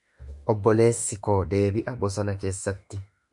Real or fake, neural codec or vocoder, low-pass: fake; autoencoder, 48 kHz, 32 numbers a frame, DAC-VAE, trained on Japanese speech; 10.8 kHz